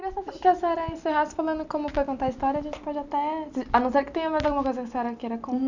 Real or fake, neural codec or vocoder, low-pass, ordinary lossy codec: real; none; 7.2 kHz; none